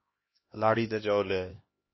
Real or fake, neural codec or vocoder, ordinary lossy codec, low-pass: fake; codec, 16 kHz, 1 kbps, X-Codec, HuBERT features, trained on LibriSpeech; MP3, 24 kbps; 7.2 kHz